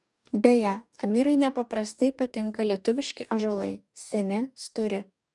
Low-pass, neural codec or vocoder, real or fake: 10.8 kHz; codec, 44.1 kHz, 2.6 kbps, DAC; fake